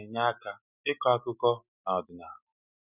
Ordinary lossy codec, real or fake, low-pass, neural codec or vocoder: none; real; 3.6 kHz; none